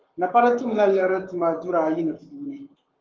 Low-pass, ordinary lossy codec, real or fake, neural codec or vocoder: 7.2 kHz; Opus, 32 kbps; fake; codec, 44.1 kHz, 7.8 kbps, Pupu-Codec